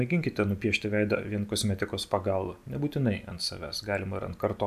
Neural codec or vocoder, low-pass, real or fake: none; 14.4 kHz; real